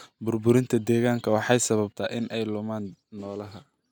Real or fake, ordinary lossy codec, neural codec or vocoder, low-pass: real; none; none; none